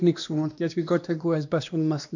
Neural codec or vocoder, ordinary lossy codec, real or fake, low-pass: codec, 16 kHz, 1 kbps, X-Codec, WavLM features, trained on Multilingual LibriSpeech; none; fake; 7.2 kHz